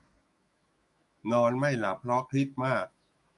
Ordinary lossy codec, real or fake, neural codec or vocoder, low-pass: MP3, 48 kbps; fake; autoencoder, 48 kHz, 128 numbers a frame, DAC-VAE, trained on Japanese speech; 14.4 kHz